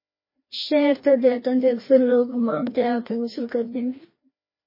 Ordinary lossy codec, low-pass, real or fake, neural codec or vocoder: MP3, 24 kbps; 5.4 kHz; fake; codec, 16 kHz, 1 kbps, FreqCodec, larger model